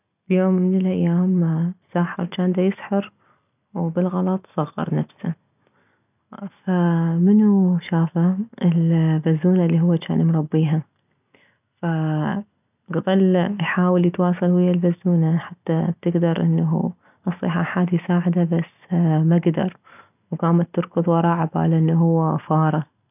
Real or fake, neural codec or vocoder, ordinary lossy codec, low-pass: real; none; none; 3.6 kHz